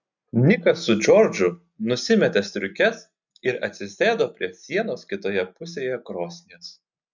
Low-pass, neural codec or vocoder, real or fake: 7.2 kHz; none; real